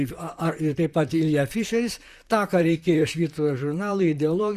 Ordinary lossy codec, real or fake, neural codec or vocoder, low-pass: Opus, 64 kbps; fake; vocoder, 44.1 kHz, 128 mel bands, Pupu-Vocoder; 14.4 kHz